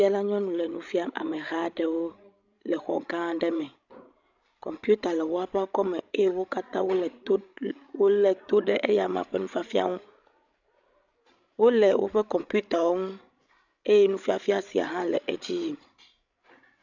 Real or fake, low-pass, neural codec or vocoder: fake; 7.2 kHz; codec, 16 kHz, 16 kbps, FreqCodec, larger model